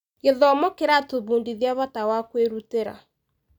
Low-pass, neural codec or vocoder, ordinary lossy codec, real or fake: 19.8 kHz; none; none; real